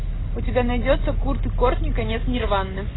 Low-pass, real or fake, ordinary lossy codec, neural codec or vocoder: 7.2 kHz; real; AAC, 16 kbps; none